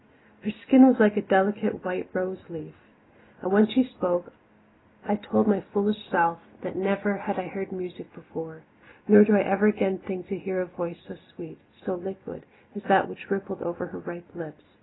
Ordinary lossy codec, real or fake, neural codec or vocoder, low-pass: AAC, 16 kbps; real; none; 7.2 kHz